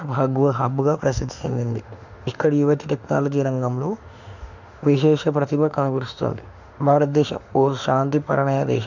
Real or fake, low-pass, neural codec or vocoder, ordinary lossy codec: fake; 7.2 kHz; autoencoder, 48 kHz, 32 numbers a frame, DAC-VAE, trained on Japanese speech; none